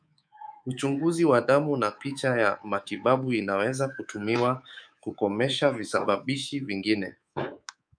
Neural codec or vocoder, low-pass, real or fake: codec, 24 kHz, 3.1 kbps, DualCodec; 9.9 kHz; fake